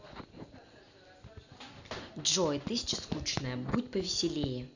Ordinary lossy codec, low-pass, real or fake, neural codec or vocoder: none; 7.2 kHz; real; none